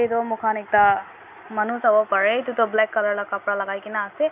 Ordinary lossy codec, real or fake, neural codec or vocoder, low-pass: none; real; none; 3.6 kHz